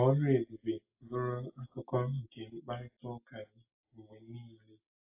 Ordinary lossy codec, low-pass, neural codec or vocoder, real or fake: AAC, 24 kbps; 3.6 kHz; none; real